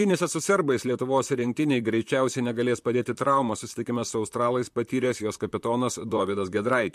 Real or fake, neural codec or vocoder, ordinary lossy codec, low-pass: fake; vocoder, 44.1 kHz, 128 mel bands, Pupu-Vocoder; MP3, 64 kbps; 14.4 kHz